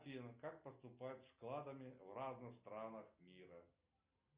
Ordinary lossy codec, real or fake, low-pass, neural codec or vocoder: Opus, 64 kbps; real; 3.6 kHz; none